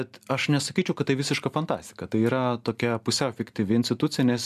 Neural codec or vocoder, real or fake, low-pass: none; real; 14.4 kHz